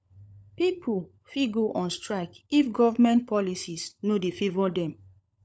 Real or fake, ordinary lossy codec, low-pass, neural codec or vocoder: fake; none; none; codec, 16 kHz, 16 kbps, FunCodec, trained on LibriTTS, 50 frames a second